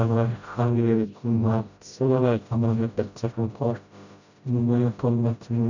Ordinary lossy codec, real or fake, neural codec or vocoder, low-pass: none; fake; codec, 16 kHz, 0.5 kbps, FreqCodec, smaller model; 7.2 kHz